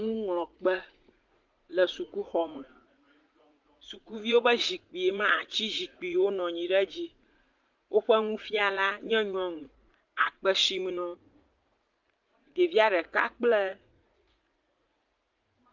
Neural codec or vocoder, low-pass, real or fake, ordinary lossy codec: vocoder, 44.1 kHz, 80 mel bands, Vocos; 7.2 kHz; fake; Opus, 32 kbps